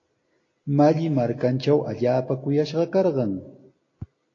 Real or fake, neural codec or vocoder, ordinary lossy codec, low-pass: real; none; AAC, 32 kbps; 7.2 kHz